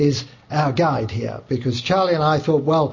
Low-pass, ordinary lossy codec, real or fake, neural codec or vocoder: 7.2 kHz; MP3, 32 kbps; real; none